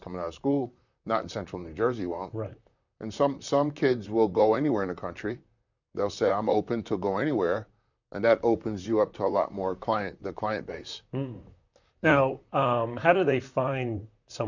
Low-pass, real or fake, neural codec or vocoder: 7.2 kHz; fake; vocoder, 44.1 kHz, 128 mel bands, Pupu-Vocoder